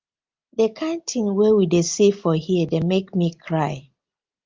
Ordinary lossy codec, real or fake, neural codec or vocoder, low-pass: Opus, 24 kbps; real; none; 7.2 kHz